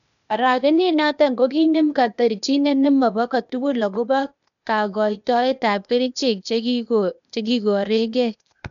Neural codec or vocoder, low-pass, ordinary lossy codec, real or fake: codec, 16 kHz, 0.8 kbps, ZipCodec; 7.2 kHz; none; fake